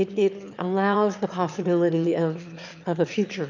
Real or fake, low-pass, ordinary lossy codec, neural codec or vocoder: fake; 7.2 kHz; MP3, 64 kbps; autoencoder, 22.05 kHz, a latent of 192 numbers a frame, VITS, trained on one speaker